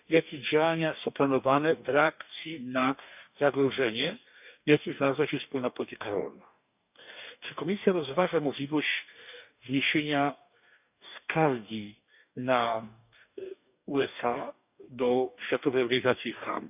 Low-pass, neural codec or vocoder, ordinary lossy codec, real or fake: 3.6 kHz; codec, 44.1 kHz, 2.6 kbps, DAC; none; fake